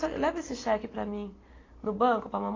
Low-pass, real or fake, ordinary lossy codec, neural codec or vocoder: 7.2 kHz; real; AAC, 32 kbps; none